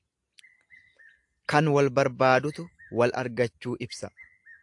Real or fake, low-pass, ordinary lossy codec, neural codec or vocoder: real; 10.8 kHz; AAC, 64 kbps; none